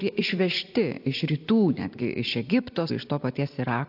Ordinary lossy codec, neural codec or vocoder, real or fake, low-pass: AAC, 48 kbps; vocoder, 44.1 kHz, 128 mel bands every 256 samples, BigVGAN v2; fake; 5.4 kHz